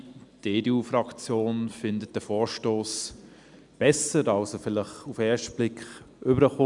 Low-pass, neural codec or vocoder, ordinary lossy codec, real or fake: 10.8 kHz; none; none; real